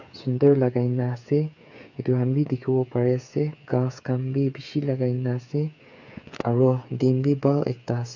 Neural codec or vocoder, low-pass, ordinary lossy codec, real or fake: codec, 16 kHz, 8 kbps, FreqCodec, smaller model; 7.2 kHz; none; fake